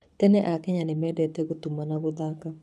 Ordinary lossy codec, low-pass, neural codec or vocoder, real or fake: none; none; codec, 24 kHz, 6 kbps, HILCodec; fake